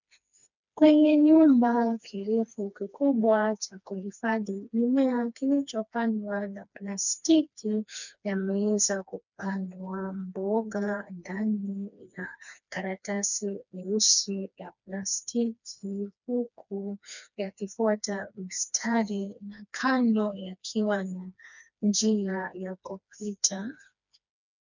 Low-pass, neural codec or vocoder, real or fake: 7.2 kHz; codec, 16 kHz, 2 kbps, FreqCodec, smaller model; fake